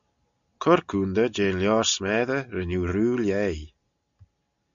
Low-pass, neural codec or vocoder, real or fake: 7.2 kHz; none; real